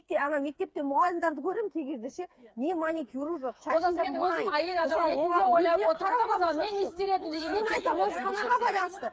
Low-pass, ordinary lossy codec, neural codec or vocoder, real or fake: none; none; codec, 16 kHz, 4 kbps, FreqCodec, smaller model; fake